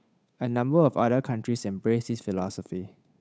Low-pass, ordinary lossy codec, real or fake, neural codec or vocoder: none; none; fake; codec, 16 kHz, 8 kbps, FunCodec, trained on Chinese and English, 25 frames a second